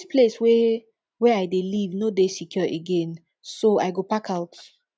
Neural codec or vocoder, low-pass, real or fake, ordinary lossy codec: none; none; real; none